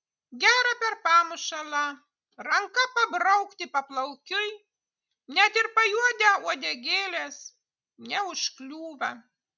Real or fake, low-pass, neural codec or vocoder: real; 7.2 kHz; none